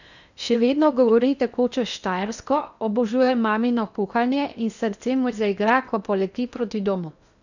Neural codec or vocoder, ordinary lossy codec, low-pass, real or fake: codec, 16 kHz in and 24 kHz out, 0.8 kbps, FocalCodec, streaming, 65536 codes; none; 7.2 kHz; fake